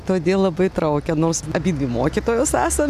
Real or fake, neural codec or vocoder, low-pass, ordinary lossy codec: real; none; 14.4 kHz; MP3, 96 kbps